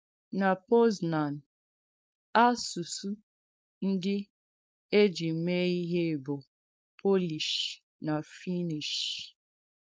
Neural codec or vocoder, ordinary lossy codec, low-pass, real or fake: codec, 16 kHz, 4.8 kbps, FACodec; none; none; fake